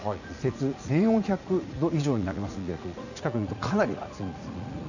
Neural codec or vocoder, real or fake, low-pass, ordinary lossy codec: vocoder, 44.1 kHz, 80 mel bands, Vocos; fake; 7.2 kHz; none